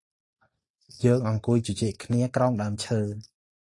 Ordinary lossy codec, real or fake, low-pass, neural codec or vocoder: AAC, 48 kbps; real; 10.8 kHz; none